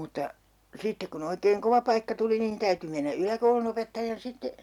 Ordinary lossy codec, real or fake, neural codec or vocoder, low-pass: none; real; none; 19.8 kHz